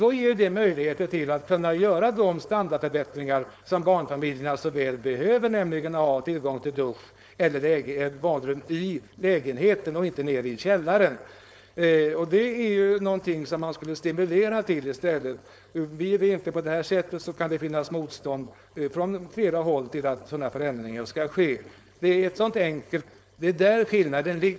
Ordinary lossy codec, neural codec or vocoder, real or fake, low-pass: none; codec, 16 kHz, 4.8 kbps, FACodec; fake; none